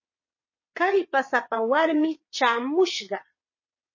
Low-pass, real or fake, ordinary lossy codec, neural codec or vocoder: 7.2 kHz; fake; MP3, 32 kbps; vocoder, 22.05 kHz, 80 mel bands, WaveNeXt